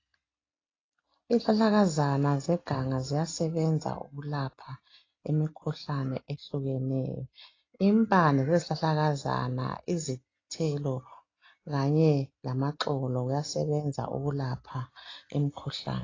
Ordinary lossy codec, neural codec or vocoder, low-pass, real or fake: AAC, 32 kbps; none; 7.2 kHz; real